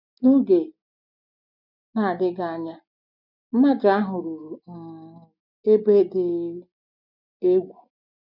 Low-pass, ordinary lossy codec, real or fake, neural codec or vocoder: 5.4 kHz; none; real; none